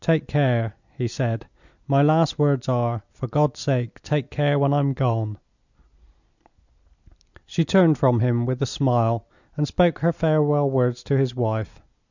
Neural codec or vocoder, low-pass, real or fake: none; 7.2 kHz; real